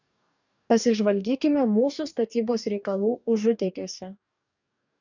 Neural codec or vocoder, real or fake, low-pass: codec, 44.1 kHz, 2.6 kbps, DAC; fake; 7.2 kHz